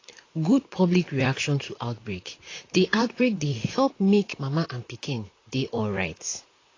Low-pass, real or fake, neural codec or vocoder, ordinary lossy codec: 7.2 kHz; fake; vocoder, 44.1 kHz, 128 mel bands, Pupu-Vocoder; AAC, 32 kbps